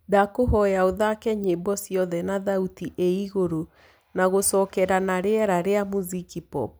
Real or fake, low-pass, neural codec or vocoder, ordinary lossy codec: real; none; none; none